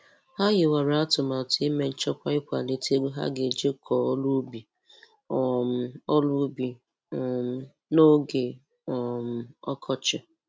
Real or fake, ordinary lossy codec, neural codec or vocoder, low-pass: real; none; none; none